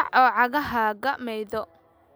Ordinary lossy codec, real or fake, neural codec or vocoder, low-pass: none; real; none; none